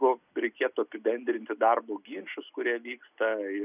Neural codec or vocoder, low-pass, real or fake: none; 3.6 kHz; real